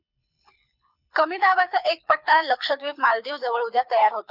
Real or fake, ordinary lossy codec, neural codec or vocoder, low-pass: fake; none; codec, 24 kHz, 6 kbps, HILCodec; 5.4 kHz